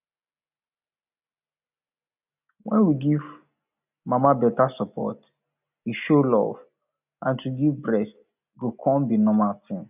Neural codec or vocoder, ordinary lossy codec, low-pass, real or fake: none; none; 3.6 kHz; real